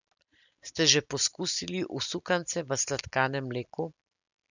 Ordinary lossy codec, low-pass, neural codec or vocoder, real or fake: none; 7.2 kHz; none; real